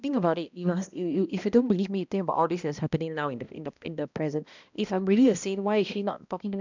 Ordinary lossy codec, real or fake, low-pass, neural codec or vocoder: none; fake; 7.2 kHz; codec, 16 kHz, 1 kbps, X-Codec, HuBERT features, trained on balanced general audio